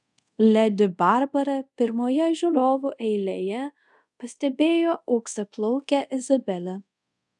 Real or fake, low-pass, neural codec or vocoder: fake; 10.8 kHz; codec, 24 kHz, 0.5 kbps, DualCodec